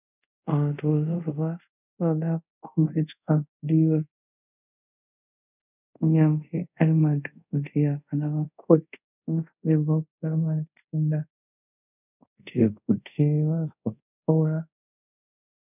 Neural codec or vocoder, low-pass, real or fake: codec, 24 kHz, 0.5 kbps, DualCodec; 3.6 kHz; fake